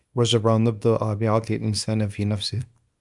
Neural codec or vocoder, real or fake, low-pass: codec, 24 kHz, 0.9 kbps, WavTokenizer, small release; fake; 10.8 kHz